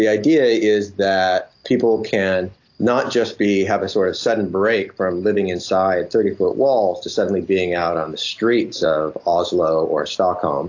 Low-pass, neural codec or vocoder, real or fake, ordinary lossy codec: 7.2 kHz; none; real; AAC, 48 kbps